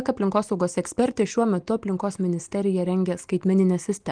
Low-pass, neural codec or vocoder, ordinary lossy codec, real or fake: 9.9 kHz; none; Opus, 24 kbps; real